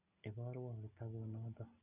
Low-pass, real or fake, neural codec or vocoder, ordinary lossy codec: 3.6 kHz; real; none; none